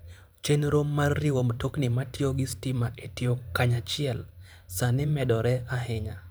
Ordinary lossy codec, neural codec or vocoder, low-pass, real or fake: none; vocoder, 44.1 kHz, 128 mel bands every 512 samples, BigVGAN v2; none; fake